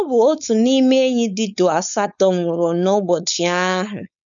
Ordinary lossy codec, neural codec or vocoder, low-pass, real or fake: none; codec, 16 kHz, 4.8 kbps, FACodec; 7.2 kHz; fake